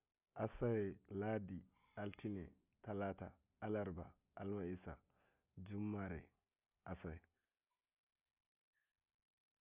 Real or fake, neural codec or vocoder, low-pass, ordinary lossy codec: real; none; 3.6 kHz; none